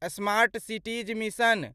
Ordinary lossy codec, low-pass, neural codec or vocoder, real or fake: Opus, 64 kbps; 14.4 kHz; none; real